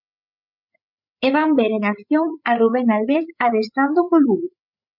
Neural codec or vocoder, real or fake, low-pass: codec, 16 kHz, 8 kbps, FreqCodec, larger model; fake; 5.4 kHz